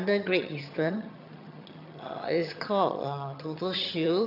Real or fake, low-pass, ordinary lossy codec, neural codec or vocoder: fake; 5.4 kHz; none; vocoder, 22.05 kHz, 80 mel bands, HiFi-GAN